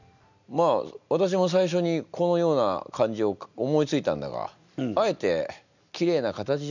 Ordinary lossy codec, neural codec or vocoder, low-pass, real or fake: none; none; 7.2 kHz; real